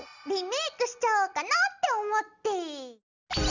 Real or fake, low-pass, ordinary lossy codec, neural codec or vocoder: real; 7.2 kHz; none; none